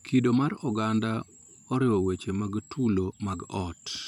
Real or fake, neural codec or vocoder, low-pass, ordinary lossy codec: fake; vocoder, 44.1 kHz, 128 mel bands every 512 samples, BigVGAN v2; 19.8 kHz; none